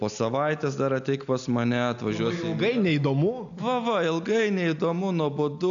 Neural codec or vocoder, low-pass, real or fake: none; 7.2 kHz; real